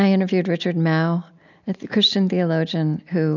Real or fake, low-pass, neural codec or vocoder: real; 7.2 kHz; none